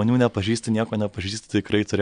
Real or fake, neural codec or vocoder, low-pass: real; none; 9.9 kHz